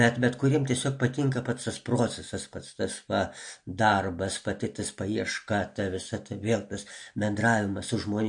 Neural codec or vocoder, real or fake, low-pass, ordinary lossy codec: none; real; 9.9 kHz; MP3, 48 kbps